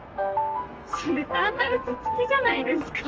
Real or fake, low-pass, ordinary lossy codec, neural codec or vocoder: fake; 7.2 kHz; Opus, 24 kbps; codec, 32 kHz, 1.9 kbps, SNAC